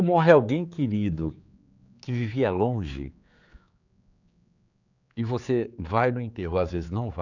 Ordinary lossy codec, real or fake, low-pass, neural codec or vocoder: none; fake; 7.2 kHz; codec, 16 kHz, 4 kbps, X-Codec, HuBERT features, trained on general audio